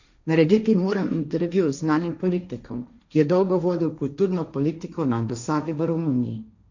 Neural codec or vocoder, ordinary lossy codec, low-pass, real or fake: codec, 16 kHz, 1.1 kbps, Voila-Tokenizer; none; 7.2 kHz; fake